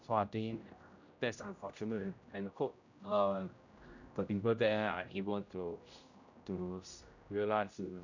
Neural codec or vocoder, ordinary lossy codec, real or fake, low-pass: codec, 16 kHz, 0.5 kbps, X-Codec, HuBERT features, trained on general audio; none; fake; 7.2 kHz